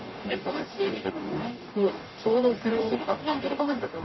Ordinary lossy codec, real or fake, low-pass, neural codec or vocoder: MP3, 24 kbps; fake; 7.2 kHz; codec, 44.1 kHz, 0.9 kbps, DAC